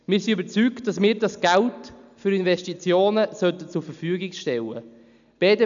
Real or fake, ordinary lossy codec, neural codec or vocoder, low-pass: real; none; none; 7.2 kHz